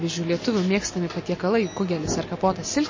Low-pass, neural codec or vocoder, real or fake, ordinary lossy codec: 7.2 kHz; none; real; MP3, 32 kbps